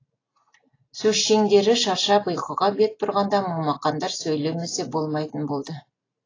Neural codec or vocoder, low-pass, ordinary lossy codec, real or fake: none; 7.2 kHz; AAC, 32 kbps; real